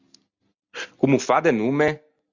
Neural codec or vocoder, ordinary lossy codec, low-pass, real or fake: none; Opus, 64 kbps; 7.2 kHz; real